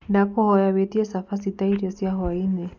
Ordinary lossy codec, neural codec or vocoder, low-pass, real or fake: none; none; 7.2 kHz; real